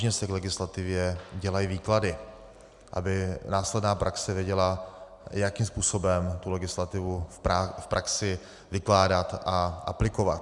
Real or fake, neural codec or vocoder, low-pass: real; none; 10.8 kHz